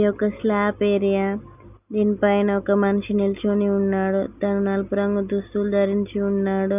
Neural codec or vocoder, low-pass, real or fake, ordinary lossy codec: none; 3.6 kHz; real; none